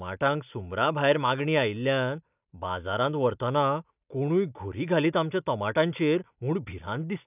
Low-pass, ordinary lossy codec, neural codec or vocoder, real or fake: 3.6 kHz; none; none; real